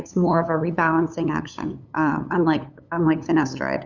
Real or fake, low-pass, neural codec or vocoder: fake; 7.2 kHz; codec, 16 kHz, 8 kbps, FunCodec, trained on LibriTTS, 25 frames a second